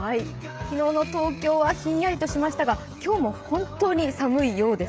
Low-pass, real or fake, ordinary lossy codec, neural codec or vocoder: none; fake; none; codec, 16 kHz, 16 kbps, FreqCodec, smaller model